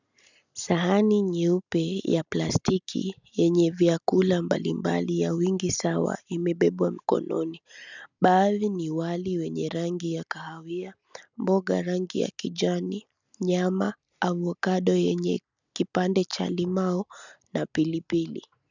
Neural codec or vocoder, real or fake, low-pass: none; real; 7.2 kHz